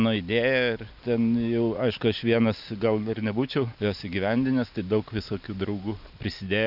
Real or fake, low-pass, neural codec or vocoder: real; 5.4 kHz; none